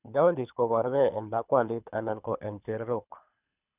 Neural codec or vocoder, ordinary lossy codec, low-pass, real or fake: codec, 24 kHz, 3 kbps, HILCodec; none; 3.6 kHz; fake